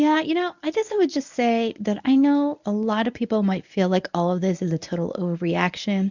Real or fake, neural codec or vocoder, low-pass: fake; codec, 24 kHz, 0.9 kbps, WavTokenizer, medium speech release version 1; 7.2 kHz